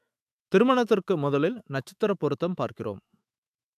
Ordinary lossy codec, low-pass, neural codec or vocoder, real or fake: AAC, 96 kbps; 14.4 kHz; autoencoder, 48 kHz, 128 numbers a frame, DAC-VAE, trained on Japanese speech; fake